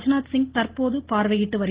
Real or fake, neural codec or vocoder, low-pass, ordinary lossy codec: real; none; 3.6 kHz; Opus, 16 kbps